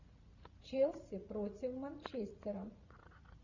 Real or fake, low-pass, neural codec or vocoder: fake; 7.2 kHz; vocoder, 44.1 kHz, 80 mel bands, Vocos